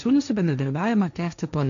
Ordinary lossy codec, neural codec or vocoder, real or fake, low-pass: MP3, 96 kbps; codec, 16 kHz, 1.1 kbps, Voila-Tokenizer; fake; 7.2 kHz